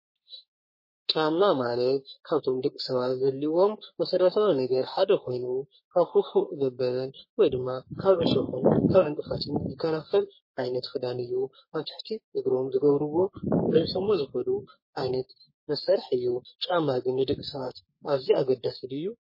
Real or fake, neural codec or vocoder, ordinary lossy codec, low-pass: fake; codec, 44.1 kHz, 3.4 kbps, Pupu-Codec; MP3, 24 kbps; 5.4 kHz